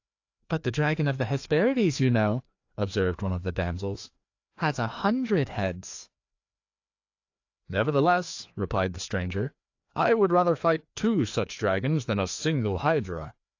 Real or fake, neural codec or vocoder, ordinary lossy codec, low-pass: fake; codec, 16 kHz, 2 kbps, FreqCodec, larger model; AAC, 48 kbps; 7.2 kHz